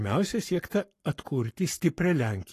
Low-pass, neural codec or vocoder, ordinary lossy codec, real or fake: 14.4 kHz; codec, 44.1 kHz, 7.8 kbps, Pupu-Codec; AAC, 48 kbps; fake